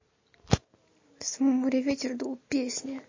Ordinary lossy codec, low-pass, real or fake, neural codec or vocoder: MP3, 32 kbps; 7.2 kHz; real; none